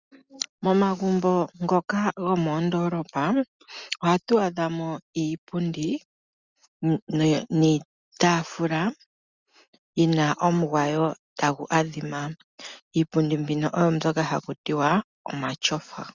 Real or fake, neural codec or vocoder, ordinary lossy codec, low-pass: real; none; Opus, 64 kbps; 7.2 kHz